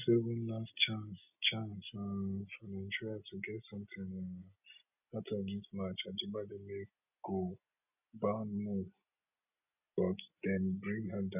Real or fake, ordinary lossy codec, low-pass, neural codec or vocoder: real; none; 3.6 kHz; none